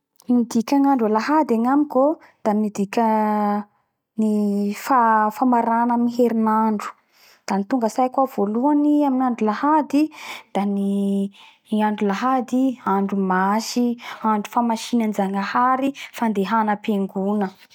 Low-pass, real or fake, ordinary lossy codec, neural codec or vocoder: 19.8 kHz; real; none; none